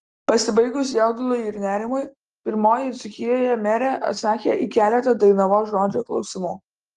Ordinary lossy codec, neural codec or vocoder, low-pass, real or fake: Opus, 24 kbps; none; 9.9 kHz; real